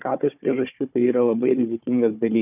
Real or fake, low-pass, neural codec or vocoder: fake; 3.6 kHz; codec, 16 kHz, 4 kbps, FunCodec, trained on Chinese and English, 50 frames a second